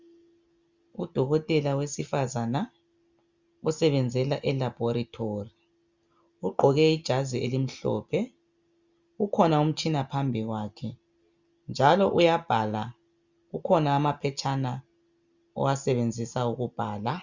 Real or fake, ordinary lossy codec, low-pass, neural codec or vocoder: real; Opus, 64 kbps; 7.2 kHz; none